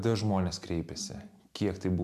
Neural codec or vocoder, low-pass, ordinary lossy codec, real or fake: none; 14.4 kHz; MP3, 96 kbps; real